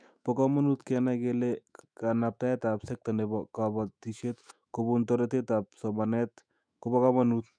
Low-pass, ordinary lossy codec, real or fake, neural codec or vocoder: 9.9 kHz; none; fake; autoencoder, 48 kHz, 128 numbers a frame, DAC-VAE, trained on Japanese speech